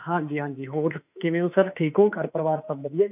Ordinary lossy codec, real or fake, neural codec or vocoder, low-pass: none; fake; autoencoder, 48 kHz, 32 numbers a frame, DAC-VAE, trained on Japanese speech; 3.6 kHz